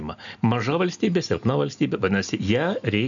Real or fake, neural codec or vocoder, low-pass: real; none; 7.2 kHz